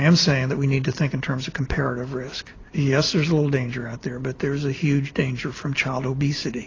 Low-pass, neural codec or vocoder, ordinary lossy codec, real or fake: 7.2 kHz; none; AAC, 32 kbps; real